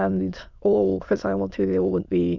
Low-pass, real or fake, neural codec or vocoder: 7.2 kHz; fake; autoencoder, 22.05 kHz, a latent of 192 numbers a frame, VITS, trained on many speakers